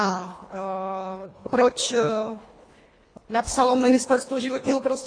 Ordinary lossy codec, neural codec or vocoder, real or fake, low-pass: AAC, 32 kbps; codec, 24 kHz, 1.5 kbps, HILCodec; fake; 9.9 kHz